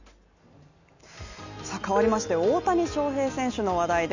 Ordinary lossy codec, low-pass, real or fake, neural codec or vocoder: none; 7.2 kHz; real; none